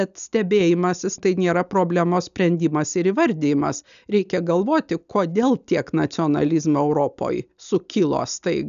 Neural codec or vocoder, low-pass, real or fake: none; 7.2 kHz; real